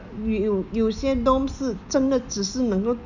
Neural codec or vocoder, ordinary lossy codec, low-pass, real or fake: none; none; 7.2 kHz; real